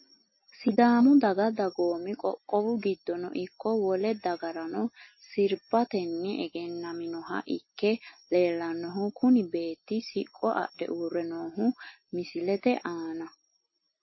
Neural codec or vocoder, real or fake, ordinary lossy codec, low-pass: none; real; MP3, 24 kbps; 7.2 kHz